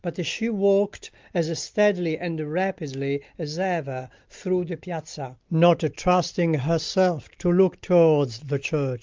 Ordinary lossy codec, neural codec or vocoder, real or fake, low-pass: Opus, 24 kbps; none; real; 7.2 kHz